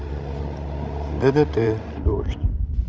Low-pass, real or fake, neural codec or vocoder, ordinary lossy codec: none; fake; codec, 16 kHz, 16 kbps, FreqCodec, larger model; none